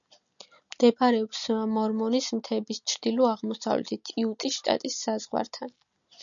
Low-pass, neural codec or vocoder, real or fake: 7.2 kHz; none; real